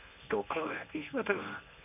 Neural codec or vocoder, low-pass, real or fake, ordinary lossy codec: codec, 24 kHz, 0.9 kbps, WavTokenizer, medium speech release version 1; 3.6 kHz; fake; none